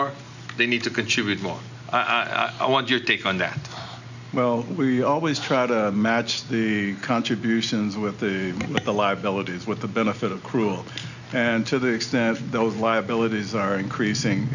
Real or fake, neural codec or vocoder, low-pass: real; none; 7.2 kHz